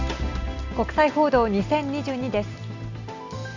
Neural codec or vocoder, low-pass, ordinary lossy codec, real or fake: none; 7.2 kHz; none; real